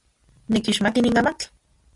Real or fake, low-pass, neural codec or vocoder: real; 10.8 kHz; none